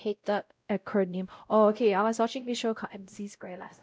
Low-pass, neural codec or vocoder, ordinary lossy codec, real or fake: none; codec, 16 kHz, 0.5 kbps, X-Codec, WavLM features, trained on Multilingual LibriSpeech; none; fake